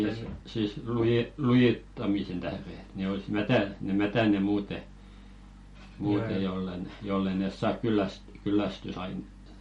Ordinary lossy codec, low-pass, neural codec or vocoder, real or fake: MP3, 48 kbps; 19.8 kHz; none; real